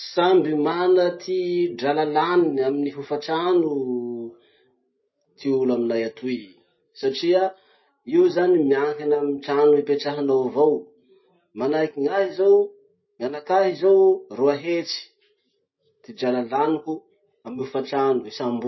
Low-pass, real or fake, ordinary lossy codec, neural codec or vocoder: 7.2 kHz; real; MP3, 24 kbps; none